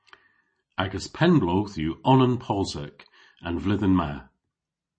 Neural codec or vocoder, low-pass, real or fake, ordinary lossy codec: none; 9.9 kHz; real; MP3, 32 kbps